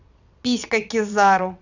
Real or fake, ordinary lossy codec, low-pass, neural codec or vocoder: fake; none; 7.2 kHz; vocoder, 22.05 kHz, 80 mel bands, WaveNeXt